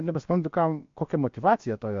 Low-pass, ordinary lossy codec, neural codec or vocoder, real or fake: 7.2 kHz; MP3, 48 kbps; codec, 16 kHz, about 1 kbps, DyCAST, with the encoder's durations; fake